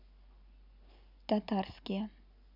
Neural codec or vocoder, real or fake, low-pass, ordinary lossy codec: none; real; 5.4 kHz; none